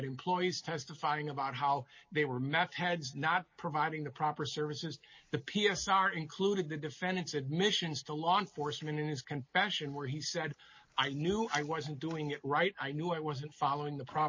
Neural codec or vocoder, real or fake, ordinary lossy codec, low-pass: none; real; MP3, 32 kbps; 7.2 kHz